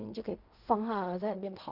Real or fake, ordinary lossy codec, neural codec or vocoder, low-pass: fake; none; codec, 16 kHz in and 24 kHz out, 0.4 kbps, LongCat-Audio-Codec, fine tuned four codebook decoder; 5.4 kHz